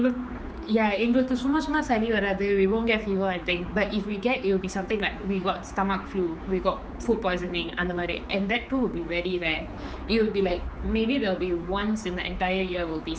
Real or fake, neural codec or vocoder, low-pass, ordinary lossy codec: fake; codec, 16 kHz, 4 kbps, X-Codec, HuBERT features, trained on general audio; none; none